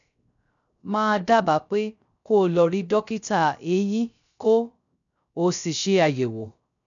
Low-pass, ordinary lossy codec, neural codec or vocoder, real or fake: 7.2 kHz; none; codec, 16 kHz, 0.3 kbps, FocalCodec; fake